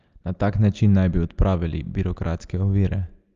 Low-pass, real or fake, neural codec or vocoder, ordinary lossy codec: 7.2 kHz; real; none; Opus, 32 kbps